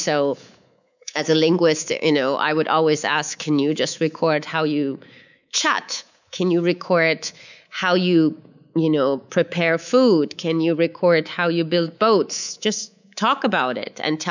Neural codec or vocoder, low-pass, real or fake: codec, 24 kHz, 3.1 kbps, DualCodec; 7.2 kHz; fake